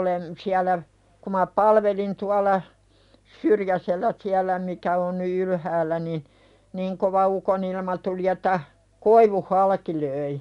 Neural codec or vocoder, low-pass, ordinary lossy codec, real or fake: none; 10.8 kHz; none; real